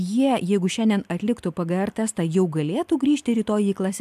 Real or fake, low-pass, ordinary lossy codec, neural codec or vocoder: real; 14.4 kHz; AAC, 96 kbps; none